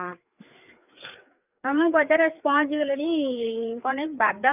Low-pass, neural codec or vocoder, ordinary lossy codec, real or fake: 3.6 kHz; codec, 16 kHz, 4 kbps, FreqCodec, larger model; none; fake